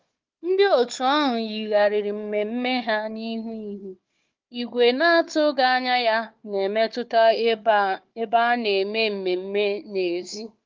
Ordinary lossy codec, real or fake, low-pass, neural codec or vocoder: Opus, 32 kbps; fake; 7.2 kHz; codec, 16 kHz, 4 kbps, FunCodec, trained on Chinese and English, 50 frames a second